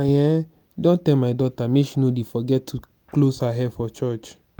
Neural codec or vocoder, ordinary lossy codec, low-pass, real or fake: none; none; none; real